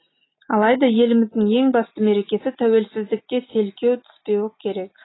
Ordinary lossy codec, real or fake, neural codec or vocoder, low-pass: AAC, 16 kbps; real; none; 7.2 kHz